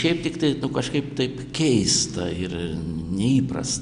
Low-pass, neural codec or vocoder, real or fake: 9.9 kHz; none; real